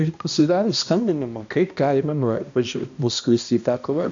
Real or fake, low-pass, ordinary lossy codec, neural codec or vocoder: fake; 7.2 kHz; AAC, 64 kbps; codec, 16 kHz, 1 kbps, X-Codec, HuBERT features, trained on balanced general audio